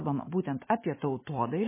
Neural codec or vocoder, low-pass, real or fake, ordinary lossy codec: none; 3.6 kHz; real; MP3, 16 kbps